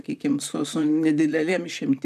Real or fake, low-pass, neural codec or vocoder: fake; 14.4 kHz; vocoder, 44.1 kHz, 128 mel bands, Pupu-Vocoder